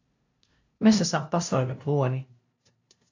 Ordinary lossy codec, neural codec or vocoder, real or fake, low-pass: AAC, 48 kbps; codec, 16 kHz, 0.5 kbps, FunCodec, trained on LibriTTS, 25 frames a second; fake; 7.2 kHz